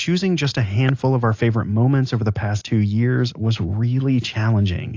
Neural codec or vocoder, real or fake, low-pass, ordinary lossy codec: none; real; 7.2 kHz; AAC, 48 kbps